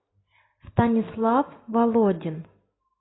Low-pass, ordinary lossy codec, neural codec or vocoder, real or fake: 7.2 kHz; AAC, 16 kbps; none; real